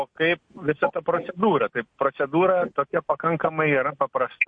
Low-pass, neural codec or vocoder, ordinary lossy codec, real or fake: 9.9 kHz; none; MP3, 48 kbps; real